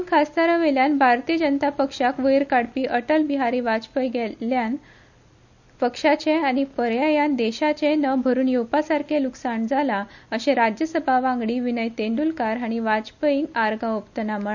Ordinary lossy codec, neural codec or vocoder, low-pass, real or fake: none; none; 7.2 kHz; real